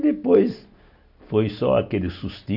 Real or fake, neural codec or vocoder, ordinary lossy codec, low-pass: real; none; none; 5.4 kHz